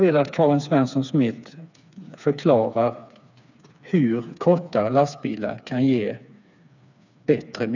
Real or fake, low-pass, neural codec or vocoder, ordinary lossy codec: fake; 7.2 kHz; codec, 16 kHz, 4 kbps, FreqCodec, smaller model; none